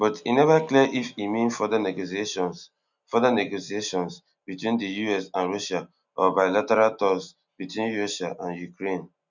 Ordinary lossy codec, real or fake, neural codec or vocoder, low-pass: none; real; none; 7.2 kHz